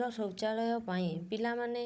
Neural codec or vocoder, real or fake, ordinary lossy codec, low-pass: codec, 16 kHz, 16 kbps, FunCodec, trained on Chinese and English, 50 frames a second; fake; none; none